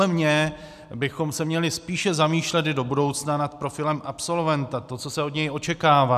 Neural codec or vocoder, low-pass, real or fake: none; 14.4 kHz; real